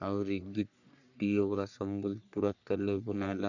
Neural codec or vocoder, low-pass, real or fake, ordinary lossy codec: codec, 44.1 kHz, 3.4 kbps, Pupu-Codec; 7.2 kHz; fake; none